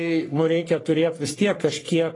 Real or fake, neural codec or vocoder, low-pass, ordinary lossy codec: fake; codec, 44.1 kHz, 3.4 kbps, Pupu-Codec; 10.8 kHz; AAC, 32 kbps